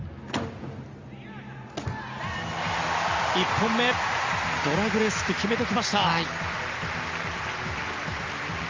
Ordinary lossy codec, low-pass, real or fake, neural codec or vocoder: Opus, 32 kbps; 7.2 kHz; real; none